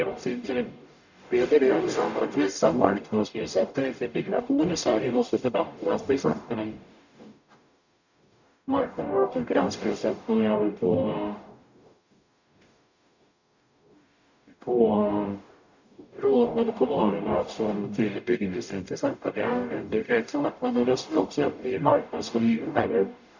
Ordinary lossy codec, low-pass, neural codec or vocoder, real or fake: none; 7.2 kHz; codec, 44.1 kHz, 0.9 kbps, DAC; fake